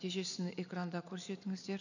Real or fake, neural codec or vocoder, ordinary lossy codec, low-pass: real; none; none; 7.2 kHz